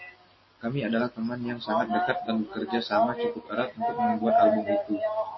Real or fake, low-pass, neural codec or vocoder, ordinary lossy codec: real; 7.2 kHz; none; MP3, 24 kbps